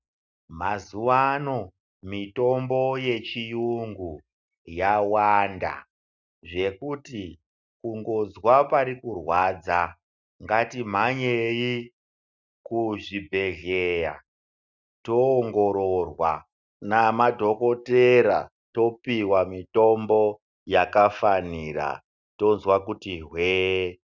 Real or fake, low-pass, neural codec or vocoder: real; 7.2 kHz; none